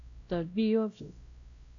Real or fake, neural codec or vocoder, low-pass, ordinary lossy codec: fake; codec, 16 kHz, 0.5 kbps, X-Codec, WavLM features, trained on Multilingual LibriSpeech; 7.2 kHz; AAC, 48 kbps